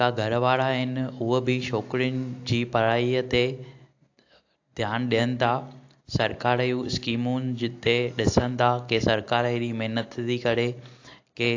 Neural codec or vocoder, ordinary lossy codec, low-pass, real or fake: none; AAC, 48 kbps; 7.2 kHz; real